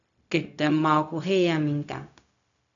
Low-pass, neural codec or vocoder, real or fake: 7.2 kHz; codec, 16 kHz, 0.4 kbps, LongCat-Audio-Codec; fake